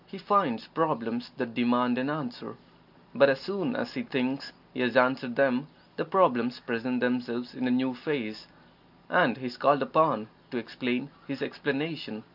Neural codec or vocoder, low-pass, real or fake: none; 5.4 kHz; real